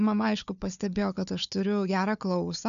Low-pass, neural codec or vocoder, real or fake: 7.2 kHz; codec, 16 kHz, 4 kbps, FunCodec, trained on Chinese and English, 50 frames a second; fake